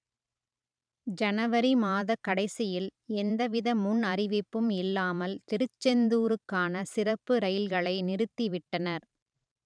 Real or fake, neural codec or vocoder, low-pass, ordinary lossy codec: fake; vocoder, 44.1 kHz, 128 mel bands every 512 samples, BigVGAN v2; 9.9 kHz; none